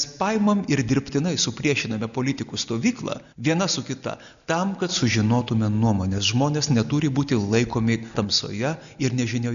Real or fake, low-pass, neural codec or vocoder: real; 7.2 kHz; none